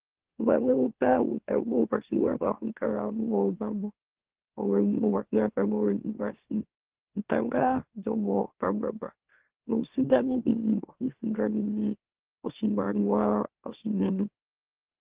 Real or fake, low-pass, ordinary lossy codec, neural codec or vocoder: fake; 3.6 kHz; Opus, 16 kbps; autoencoder, 44.1 kHz, a latent of 192 numbers a frame, MeloTTS